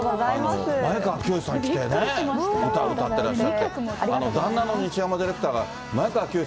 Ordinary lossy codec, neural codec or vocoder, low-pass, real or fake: none; none; none; real